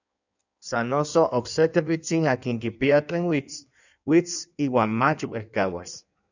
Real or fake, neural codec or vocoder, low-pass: fake; codec, 16 kHz in and 24 kHz out, 1.1 kbps, FireRedTTS-2 codec; 7.2 kHz